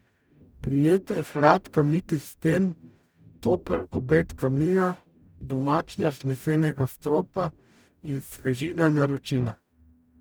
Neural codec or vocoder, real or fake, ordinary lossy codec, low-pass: codec, 44.1 kHz, 0.9 kbps, DAC; fake; none; none